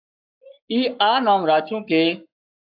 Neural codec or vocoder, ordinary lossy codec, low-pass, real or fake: codec, 44.1 kHz, 7.8 kbps, Pupu-Codec; AAC, 48 kbps; 5.4 kHz; fake